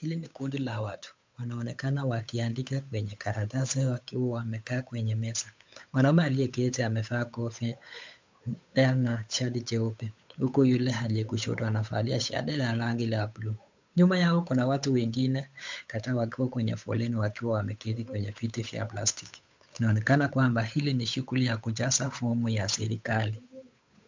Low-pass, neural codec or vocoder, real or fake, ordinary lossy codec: 7.2 kHz; codec, 16 kHz, 8 kbps, FunCodec, trained on Chinese and English, 25 frames a second; fake; MP3, 64 kbps